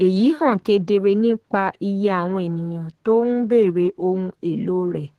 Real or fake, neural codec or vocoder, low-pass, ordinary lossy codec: fake; codec, 32 kHz, 1.9 kbps, SNAC; 14.4 kHz; Opus, 16 kbps